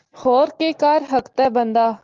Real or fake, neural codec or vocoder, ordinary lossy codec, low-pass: real; none; Opus, 32 kbps; 7.2 kHz